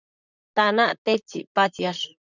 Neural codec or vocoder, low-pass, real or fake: vocoder, 44.1 kHz, 128 mel bands, Pupu-Vocoder; 7.2 kHz; fake